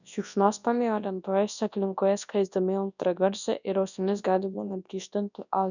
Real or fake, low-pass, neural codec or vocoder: fake; 7.2 kHz; codec, 24 kHz, 0.9 kbps, WavTokenizer, large speech release